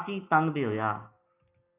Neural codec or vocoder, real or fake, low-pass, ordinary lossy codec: none; real; 3.6 kHz; AAC, 16 kbps